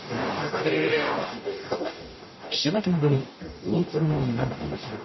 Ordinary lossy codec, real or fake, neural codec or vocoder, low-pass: MP3, 24 kbps; fake; codec, 44.1 kHz, 0.9 kbps, DAC; 7.2 kHz